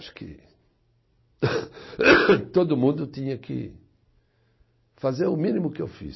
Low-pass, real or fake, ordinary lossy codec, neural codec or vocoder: 7.2 kHz; real; MP3, 24 kbps; none